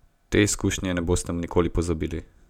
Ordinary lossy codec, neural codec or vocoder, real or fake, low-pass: none; none; real; 19.8 kHz